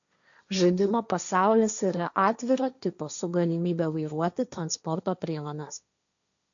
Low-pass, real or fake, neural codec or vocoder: 7.2 kHz; fake; codec, 16 kHz, 1.1 kbps, Voila-Tokenizer